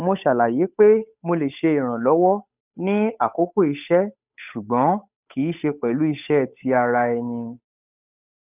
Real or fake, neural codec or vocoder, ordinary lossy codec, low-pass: fake; codec, 16 kHz, 8 kbps, FunCodec, trained on Chinese and English, 25 frames a second; none; 3.6 kHz